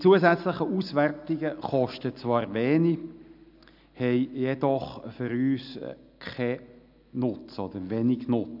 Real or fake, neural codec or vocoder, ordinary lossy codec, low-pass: real; none; none; 5.4 kHz